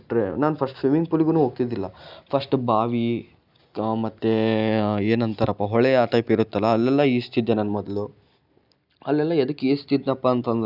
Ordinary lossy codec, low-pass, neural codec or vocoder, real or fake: none; 5.4 kHz; none; real